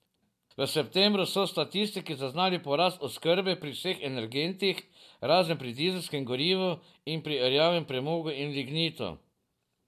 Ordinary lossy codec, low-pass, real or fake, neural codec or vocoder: MP3, 96 kbps; 14.4 kHz; real; none